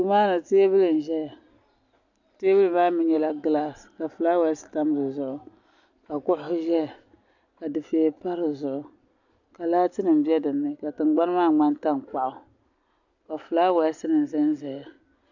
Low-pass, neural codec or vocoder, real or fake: 7.2 kHz; none; real